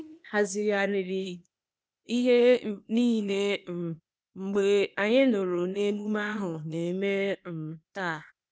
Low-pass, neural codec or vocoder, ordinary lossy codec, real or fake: none; codec, 16 kHz, 0.8 kbps, ZipCodec; none; fake